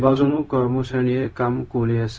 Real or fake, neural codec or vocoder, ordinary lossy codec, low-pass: fake; codec, 16 kHz, 0.4 kbps, LongCat-Audio-Codec; none; none